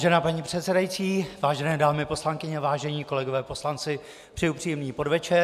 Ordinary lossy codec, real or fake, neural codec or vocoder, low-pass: AAC, 96 kbps; real; none; 14.4 kHz